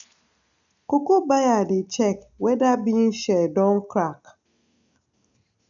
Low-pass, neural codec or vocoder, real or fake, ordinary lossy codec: 7.2 kHz; none; real; none